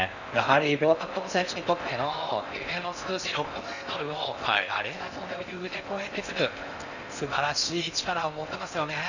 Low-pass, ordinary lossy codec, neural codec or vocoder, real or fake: 7.2 kHz; none; codec, 16 kHz in and 24 kHz out, 0.6 kbps, FocalCodec, streaming, 4096 codes; fake